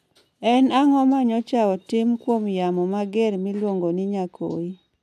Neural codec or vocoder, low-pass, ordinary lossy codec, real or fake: none; 14.4 kHz; none; real